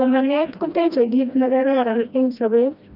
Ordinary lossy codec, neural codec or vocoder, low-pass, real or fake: none; codec, 16 kHz, 1 kbps, FreqCodec, smaller model; 5.4 kHz; fake